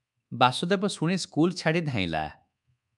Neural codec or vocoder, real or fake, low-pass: codec, 24 kHz, 1.2 kbps, DualCodec; fake; 10.8 kHz